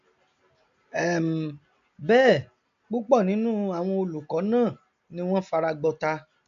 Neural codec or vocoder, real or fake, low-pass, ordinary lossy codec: none; real; 7.2 kHz; AAC, 64 kbps